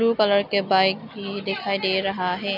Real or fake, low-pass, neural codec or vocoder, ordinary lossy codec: real; 5.4 kHz; none; none